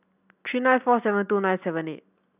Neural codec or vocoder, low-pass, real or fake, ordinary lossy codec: none; 3.6 kHz; real; none